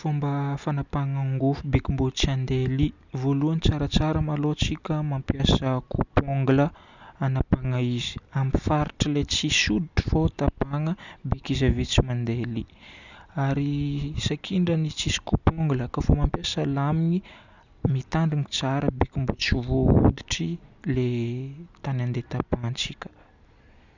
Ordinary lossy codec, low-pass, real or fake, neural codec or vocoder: none; 7.2 kHz; real; none